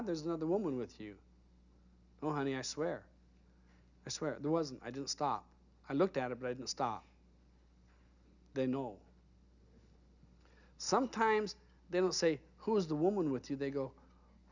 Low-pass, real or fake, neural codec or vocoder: 7.2 kHz; real; none